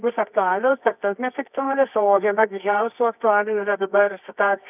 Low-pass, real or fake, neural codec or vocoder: 3.6 kHz; fake; codec, 24 kHz, 0.9 kbps, WavTokenizer, medium music audio release